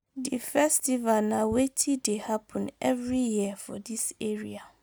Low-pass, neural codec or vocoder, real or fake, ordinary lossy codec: none; none; real; none